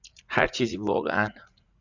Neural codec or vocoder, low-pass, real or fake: vocoder, 44.1 kHz, 80 mel bands, Vocos; 7.2 kHz; fake